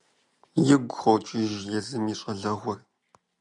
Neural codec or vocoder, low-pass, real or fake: none; 10.8 kHz; real